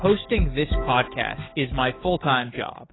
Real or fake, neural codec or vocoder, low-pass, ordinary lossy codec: fake; codec, 44.1 kHz, 7.8 kbps, DAC; 7.2 kHz; AAC, 16 kbps